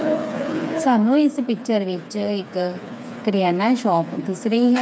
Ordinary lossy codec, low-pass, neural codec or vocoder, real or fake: none; none; codec, 16 kHz, 4 kbps, FreqCodec, smaller model; fake